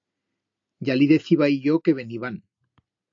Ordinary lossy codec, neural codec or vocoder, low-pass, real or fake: AAC, 48 kbps; none; 7.2 kHz; real